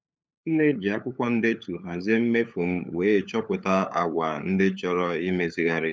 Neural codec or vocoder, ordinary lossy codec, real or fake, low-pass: codec, 16 kHz, 8 kbps, FunCodec, trained on LibriTTS, 25 frames a second; none; fake; none